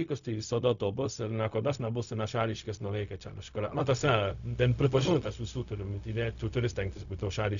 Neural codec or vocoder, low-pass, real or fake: codec, 16 kHz, 0.4 kbps, LongCat-Audio-Codec; 7.2 kHz; fake